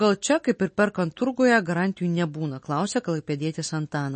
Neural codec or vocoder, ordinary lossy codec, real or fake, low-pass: none; MP3, 32 kbps; real; 10.8 kHz